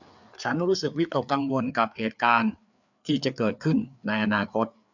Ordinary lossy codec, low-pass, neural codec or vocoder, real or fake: none; 7.2 kHz; codec, 16 kHz, 4 kbps, FreqCodec, larger model; fake